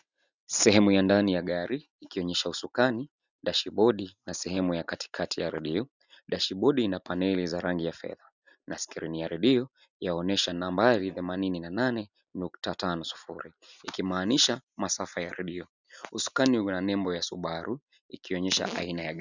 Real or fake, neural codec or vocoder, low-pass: real; none; 7.2 kHz